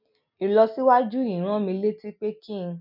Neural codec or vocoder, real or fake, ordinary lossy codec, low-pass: none; real; none; 5.4 kHz